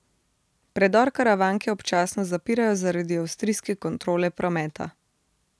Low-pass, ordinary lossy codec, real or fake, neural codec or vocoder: none; none; real; none